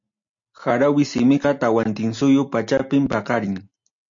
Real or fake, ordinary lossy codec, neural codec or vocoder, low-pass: real; MP3, 64 kbps; none; 7.2 kHz